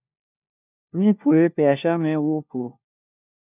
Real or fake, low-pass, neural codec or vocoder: fake; 3.6 kHz; codec, 16 kHz, 1 kbps, FunCodec, trained on LibriTTS, 50 frames a second